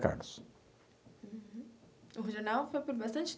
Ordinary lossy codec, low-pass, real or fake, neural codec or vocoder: none; none; real; none